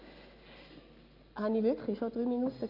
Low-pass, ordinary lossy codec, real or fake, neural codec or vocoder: 5.4 kHz; Opus, 64 kbps; real; none